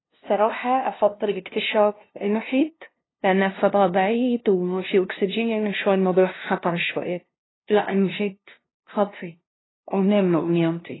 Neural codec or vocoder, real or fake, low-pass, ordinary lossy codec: codec, 16 kHz, 0.5 kbps, FunCodec, trained on LibriTTS, 25 frames a second; fake; 7.2 kHz; AAC, 16 kbps